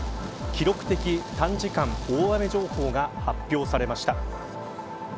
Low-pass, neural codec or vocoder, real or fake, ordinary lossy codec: none; none; real; none